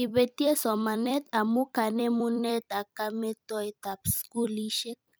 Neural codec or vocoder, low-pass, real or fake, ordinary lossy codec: vocoder, 44.1 kHz, 128 mel bands every 256 samples, BigVGAN v2; none; fake; none